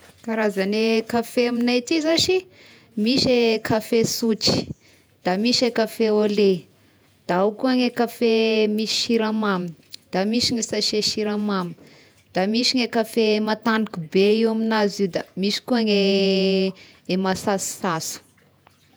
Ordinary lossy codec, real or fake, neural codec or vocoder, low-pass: none; fake; vocoder, 48 kHz, 128 mel bands, Vocos; none